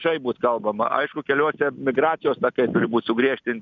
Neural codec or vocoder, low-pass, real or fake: none; 7.2 kHz; real